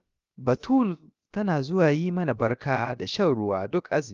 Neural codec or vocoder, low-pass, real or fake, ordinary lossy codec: codec, 16 kHz, about 1 kbps, DyCAST, with the encoder's durations; 7.2 kHz; fake; Opus, 32 kbps